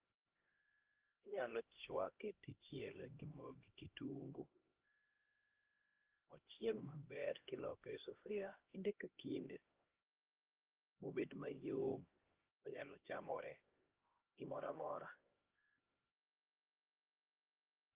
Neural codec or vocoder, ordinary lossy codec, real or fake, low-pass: codec, 16 kHz, 1 kbps, X-Codec, HuBERT features, trained on LibriSpeech; Opus, 16 kbps; fake; 3.6 kHz